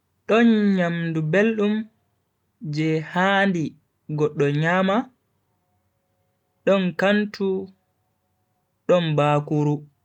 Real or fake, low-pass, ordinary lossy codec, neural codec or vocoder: real; 19.8 kHz; none; none